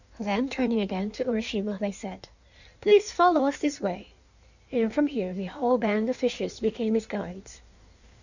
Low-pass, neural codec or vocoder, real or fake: 7.2 kHz; codec, 16 kHz in and 24 kHz out, 1.1 kbps, FireRedTTS-2 codec; fake